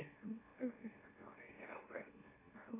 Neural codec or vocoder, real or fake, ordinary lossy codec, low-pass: autoencoder, 44.1 kHz, a latent of 192 numbers a frame, MeloTTS; fake; AAC, 16 kbps; 3.6 kHz